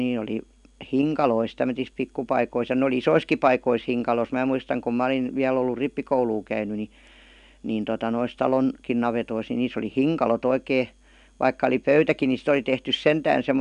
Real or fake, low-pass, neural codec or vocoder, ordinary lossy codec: real; 10.8 kHz; none; none